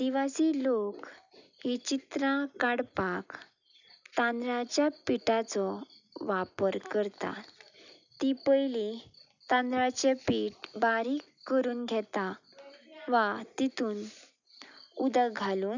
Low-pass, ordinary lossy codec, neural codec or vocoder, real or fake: 7.2 kHz; none; none; real